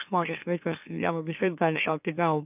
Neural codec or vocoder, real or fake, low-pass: autoencoder, 44.1 kHz, a latent of 192 numbers a frame, MeloTTS; fake; 3.6 kHz